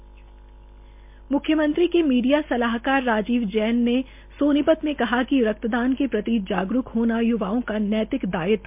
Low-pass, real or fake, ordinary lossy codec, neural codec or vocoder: 3.6 kHz; real; MP3, 32 kbps; none